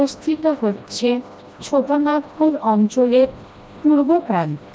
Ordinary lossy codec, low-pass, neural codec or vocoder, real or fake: none; none; codec, 16 kHz, 1 kbps, FreqCodec, smaller model; fake